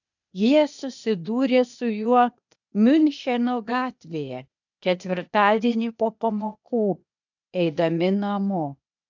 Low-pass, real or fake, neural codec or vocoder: 7.2 kHz; fake; codec, 16 kHz, 0.8 kbps, ZipCodec